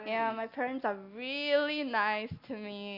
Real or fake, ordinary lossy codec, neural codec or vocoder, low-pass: real; Opus, 64 kbps; none; 5.4 kHz